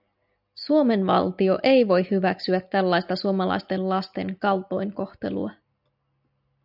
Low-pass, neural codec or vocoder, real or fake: 5.4 kHz; none; real